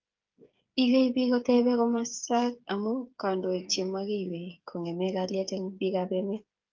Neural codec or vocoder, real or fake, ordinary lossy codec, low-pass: codec, 16 kHz, 8 kbps, FreqCodec, smaller model; fake; Opus, 24 kbps; 7.2 kHz